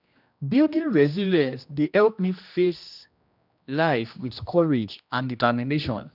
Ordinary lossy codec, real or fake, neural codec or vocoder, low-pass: none; fake; codec, 16 kHz, 1 kbps, X-Codec, HuBERT features, trained on general audio; 5.4 kHz